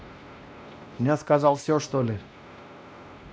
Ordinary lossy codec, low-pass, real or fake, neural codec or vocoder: none; none; fake; codec, 16 kHz, 1 kbps, X-Codec, WavLM features, trained on Multilingual LibriSpeech